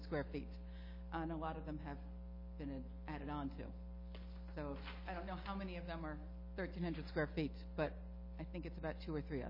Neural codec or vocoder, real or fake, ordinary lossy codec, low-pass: none; real; MP3, 24 kbps; 5.4 kHz